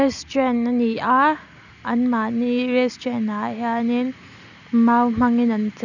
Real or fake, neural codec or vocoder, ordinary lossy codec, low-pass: real; none; none; 7.2 kHz